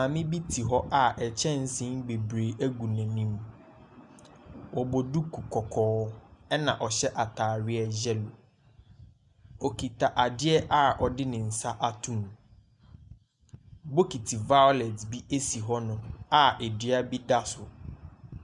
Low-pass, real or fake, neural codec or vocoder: 10.8 kHz; real; none